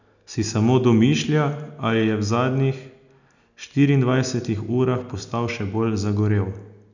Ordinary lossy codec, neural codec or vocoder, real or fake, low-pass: none; none; real; 7.2 kHz